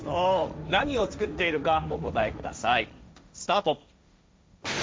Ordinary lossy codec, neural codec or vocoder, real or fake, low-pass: none; codec, 16 kHz, 1.1 kbps, Voila-Tokenizer; fake; none